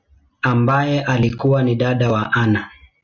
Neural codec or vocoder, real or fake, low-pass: none; real; 7.2 kHz